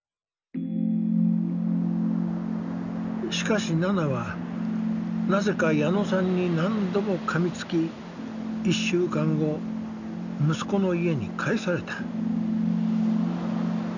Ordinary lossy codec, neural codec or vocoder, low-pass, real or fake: none; none; 7.2 kHz; real